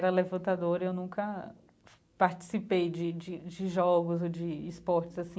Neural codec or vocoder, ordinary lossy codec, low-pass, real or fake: none; none; none; real